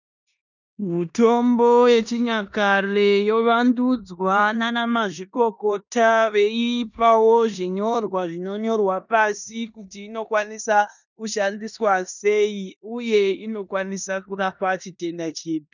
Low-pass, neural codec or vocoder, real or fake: 7.2 kHz; codec, 16 kHz in and 24 kHz out, 0.9 kbps, LongCat-Audio-Codec, four codebook decoder; fake